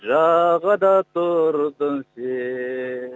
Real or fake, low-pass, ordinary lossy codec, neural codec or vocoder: real; none; none; none